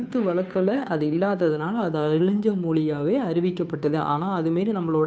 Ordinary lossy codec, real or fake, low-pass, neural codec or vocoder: none; fake; none; codec, 16 kHz, 2 kbps, FunCodec, trained on Chinese and English, 25 frames a second